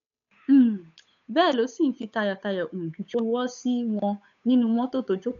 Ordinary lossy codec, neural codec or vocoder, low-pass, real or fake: none; codec, 16 kHz, 8 kbps, FunCodec, trained on Chinese and English, 25 frames a second; 7.2 kHz; fake